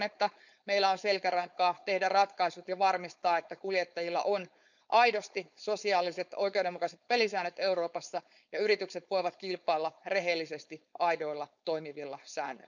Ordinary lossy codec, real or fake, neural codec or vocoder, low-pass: none; fake; codec, 16 kHz, 4.8 kbps, FACodec; 7.2 kHz